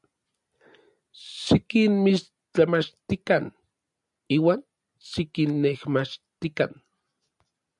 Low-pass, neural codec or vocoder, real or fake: 10.8 kHz; none; real